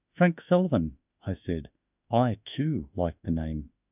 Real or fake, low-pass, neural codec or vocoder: fake; 3.6 kHz; autoencoder, 48 kHz, 32 numbers a frame, DAC-VAE, trained on Japanese speech